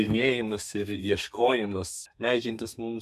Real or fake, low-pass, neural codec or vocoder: fake; 14.4 kHz; codec, 32 kHz, 1.9 kbps, SNAC